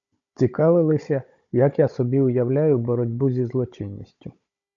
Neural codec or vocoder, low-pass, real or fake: codec, 16 kHz, 16 kbps, FunCodec, trained on Chinese and English, 50 frames a second; 7.2 kHz; fake